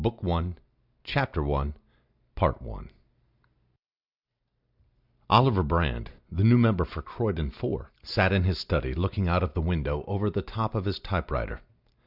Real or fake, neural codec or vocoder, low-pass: real; none; 5.4 kHz